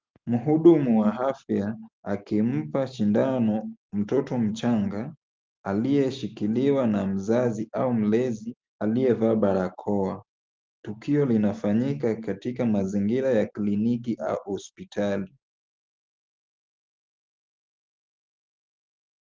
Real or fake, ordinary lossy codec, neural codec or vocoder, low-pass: real; Opus, 32 kbps; none; 7.2 kHz